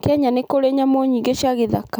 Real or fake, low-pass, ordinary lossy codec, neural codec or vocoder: real; none; none; none